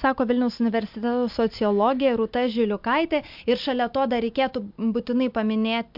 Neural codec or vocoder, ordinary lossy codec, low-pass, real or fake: none; MP3, 48 kbps; 5.4 kHz; real